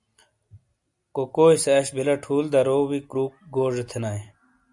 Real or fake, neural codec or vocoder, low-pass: real; none; 10.8 kHz